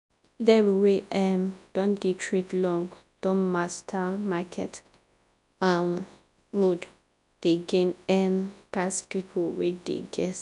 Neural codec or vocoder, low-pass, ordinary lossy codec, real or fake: codec, 24 kHz, 0.9 kbps, WavTokenizer, large speech release; 10.8 kHz; none; fake